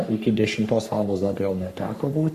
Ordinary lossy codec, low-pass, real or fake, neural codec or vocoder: Opus, 64 kbps; 14.4 kHz; fake; codec, 44.1 kHz, 3.4 kbps, Pupu-Codec